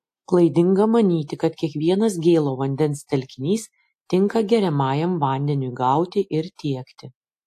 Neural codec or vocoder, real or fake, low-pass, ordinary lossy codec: none; real; 14.4 kHz; AAC, 64 kbps